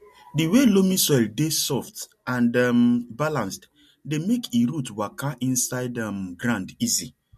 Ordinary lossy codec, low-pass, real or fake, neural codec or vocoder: MP3, 64 kbps; 14.4 kHz; real; none